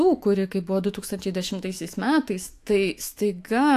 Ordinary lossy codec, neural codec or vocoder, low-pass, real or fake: AAC, 64 kbps; autoencoder, 48 kHz, 128 numbers a frame, DAC-VAE, trained on Japanese speech; 14.4 kHz; fake